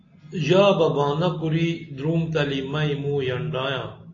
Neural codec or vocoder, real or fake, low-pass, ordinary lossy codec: none; real; 7.2 kHz; AAC, 32 kbps